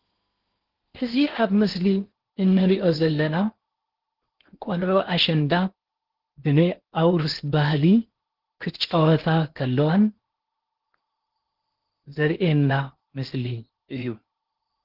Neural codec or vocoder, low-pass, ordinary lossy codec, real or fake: codec, 16 kHz in and 24 kHz out, 0.8 kbps, FocalCodec, streaming, 65536 codes; 5.4 kHz; Opus, 16 kbps; fake